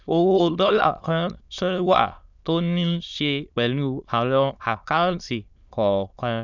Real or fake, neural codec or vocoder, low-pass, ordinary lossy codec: fake; autoencoder, 22.05 kHz, a latent of 192 numbers a frame, VITS, trained on many speakers; 7.2 kHz; none